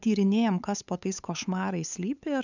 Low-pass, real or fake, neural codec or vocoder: 7.2 kHz; fake; codec, 16 kHz, 8 kbps, FunCodec, trained on LibriTTS, 25 frames a second